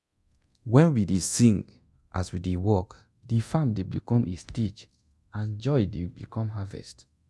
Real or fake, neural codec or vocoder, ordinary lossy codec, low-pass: fake; codec, 24 kHz, 0.9 kbps, DualCodec; none; none